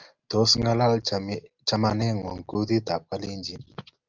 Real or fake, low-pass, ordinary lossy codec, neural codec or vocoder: real; 7.2 kHz; Opus, 32 kbps; none